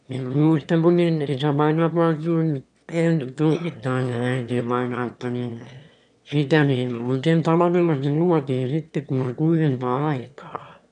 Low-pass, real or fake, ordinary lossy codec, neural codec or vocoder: 9.9 kHz; fake; none; autoencoder, 22.05 kHz, a latent of 192 numbers a frame, VITS, trained on one speaker